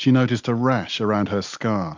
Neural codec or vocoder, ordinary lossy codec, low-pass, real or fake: none; MP3, 64 kbps; 7.2 kHz; real